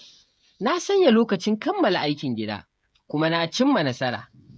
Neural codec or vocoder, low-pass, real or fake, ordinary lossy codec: codec, 16 kHz, 16 kbps, FreqCodec, smaller model; none; fake; none